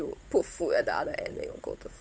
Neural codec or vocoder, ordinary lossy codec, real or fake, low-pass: codec, 16 kHz, 8 kbps, FunCodec, trained on Chinese and English, 25 frames a second; none; fake; none